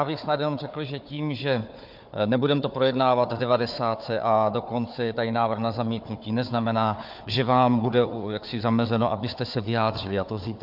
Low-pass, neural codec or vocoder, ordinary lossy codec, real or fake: 5.4 kHz; codec, 16 kHz, 4 kbps, FunCodec, trained on Chinese and English, 50 frames a second; MP3, 48 kbps; fake